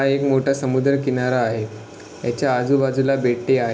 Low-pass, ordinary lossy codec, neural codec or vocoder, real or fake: none; none; none; real